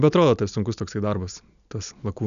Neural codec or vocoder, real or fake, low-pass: none; real; 7.2 kHz